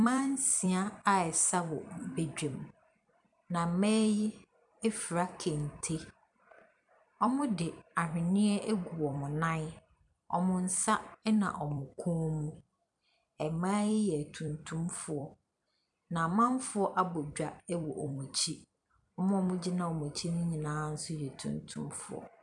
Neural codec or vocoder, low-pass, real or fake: vocoder, 44.1 kHz, 128 mel bands every 256 samples, BigVGAN v2; 10.8 kHz; fake